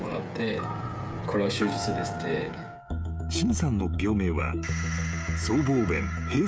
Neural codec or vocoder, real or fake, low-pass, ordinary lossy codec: codec, 16 kHz, 16 kbps, FreqCodec, smaller model; fake; none; none